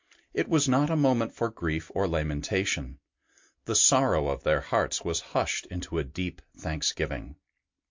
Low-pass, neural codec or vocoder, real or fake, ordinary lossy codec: 7.2 kHz; none; real; MP3, 48 kbps